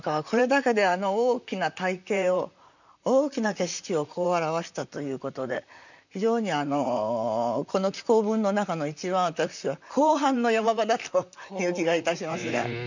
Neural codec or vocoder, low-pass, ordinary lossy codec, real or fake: vocoder, 44.1 kHz, 128 mel bands, Pupu-Vocoder; 7.2 kHz; none; fake